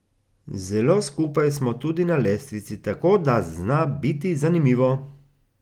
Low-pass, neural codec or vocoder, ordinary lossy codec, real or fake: 19.8 kHz; none; Opus, 24 kbps; real